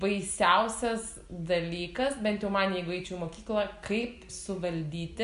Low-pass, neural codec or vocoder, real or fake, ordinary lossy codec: 10.8 kHz; none; real; AAC, 96 kbps